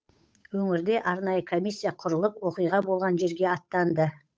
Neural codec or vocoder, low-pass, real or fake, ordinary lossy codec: codec, 16 kHz, 8 kbps, FunCodec, trained on Chinese and English, 25 frames a second; none; fake; none